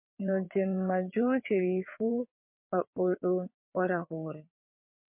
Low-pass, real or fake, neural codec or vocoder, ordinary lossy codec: 3.6 kHz; fake; vocoder, 22.05 kHz, 80 mel bands, WaveNeXt; MP3, 32 kbps